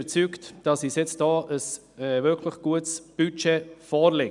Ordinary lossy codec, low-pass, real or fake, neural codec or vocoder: none; 10.8 kHz; real; none